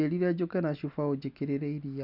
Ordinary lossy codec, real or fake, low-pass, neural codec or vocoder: none; real; 5.4 kHz; none